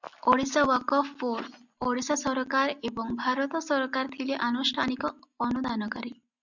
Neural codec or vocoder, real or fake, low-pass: none; real; 7.2 kHz